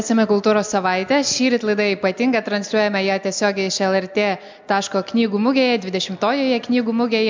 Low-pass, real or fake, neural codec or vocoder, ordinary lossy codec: 7.2 kHz; real; none; MP3, 64 kbps